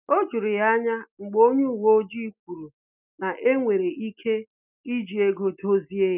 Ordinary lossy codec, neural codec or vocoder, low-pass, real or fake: none; none; 3.6 kHz; real